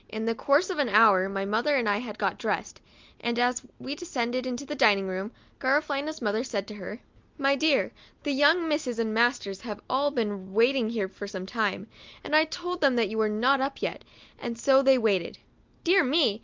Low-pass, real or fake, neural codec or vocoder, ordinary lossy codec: 7.2 kHz; real; none; Opus, 24 kbps